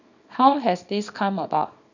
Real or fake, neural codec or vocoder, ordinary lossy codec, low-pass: fake; codec, 24 kHz, 0.9 kbps, WavTokenizer, small release; none; 7.2 kHz